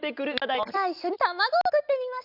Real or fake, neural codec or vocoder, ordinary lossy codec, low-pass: real; none; none; 5.4 kHz